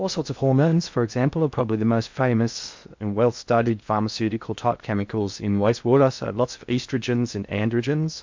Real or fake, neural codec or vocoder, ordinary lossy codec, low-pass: fake; codec, 16 kHz in and 24 kHz out, 0.6 kbps, FocalCodec, streaming, 2048 codes; MP3, 64 kbps; 7.2 kHz